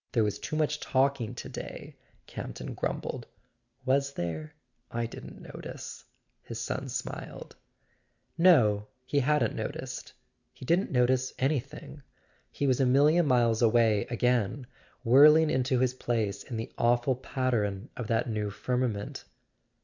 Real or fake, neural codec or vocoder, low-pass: real; none; 7.2 kHz